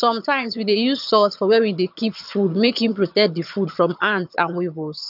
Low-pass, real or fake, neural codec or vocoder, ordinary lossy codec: 5.4 kHz; fake; vocoder, 22.05 kHz, 80 mel bands, HiFi-GAN; none